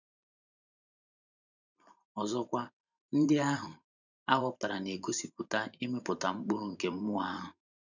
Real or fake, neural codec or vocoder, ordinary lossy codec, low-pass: fake; vocoder, 44.1 kHz, 128 mel bands every 512 samples, BigVGAN v2; none; 7.2 kHz